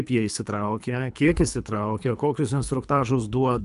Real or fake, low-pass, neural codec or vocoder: fake; 10.8 kHz; codec, 24 kHz, 3 kbps, HILCodec